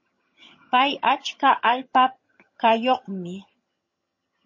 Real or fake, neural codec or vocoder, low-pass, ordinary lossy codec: fake; vocoder, 22.05 kHz, 80 mel bands, HiFi-GAN; 7.2 kHz; MP3, 32 kbps